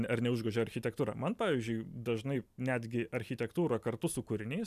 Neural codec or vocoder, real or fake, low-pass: none; real; 14.4 kHz